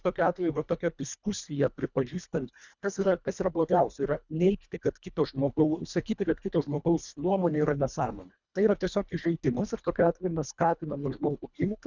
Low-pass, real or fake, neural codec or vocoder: 7.2 kHz; fake; codec, 24 kHz, 1.5 kbps, HILCodec